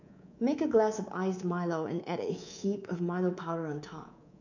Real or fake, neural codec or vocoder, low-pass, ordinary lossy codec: fake; codec, 24 kHz, 3.1 kbps, DualCodec; 7.2 kHz; none